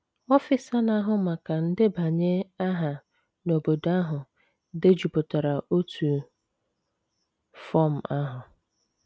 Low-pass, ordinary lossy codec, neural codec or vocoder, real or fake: none; none; none; real